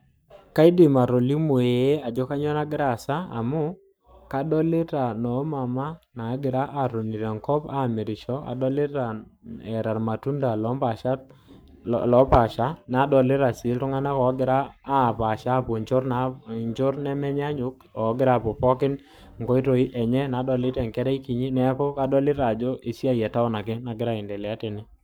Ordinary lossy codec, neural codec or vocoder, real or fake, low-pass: none; codec, 44.1 kHz, 7.8 kbps, Pupu-Codec; fake; none